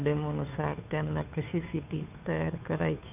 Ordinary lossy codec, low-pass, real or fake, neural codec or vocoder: none; 3.6 kHz; fake; codec, 16 kHz, 2 kbps, FunCodec, trained on Chinese and English, 25 frames a second